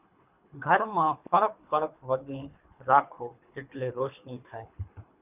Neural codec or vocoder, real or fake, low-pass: codec, 24 kHz, 3 kbps, HILCodec; fake; 3.6 kHz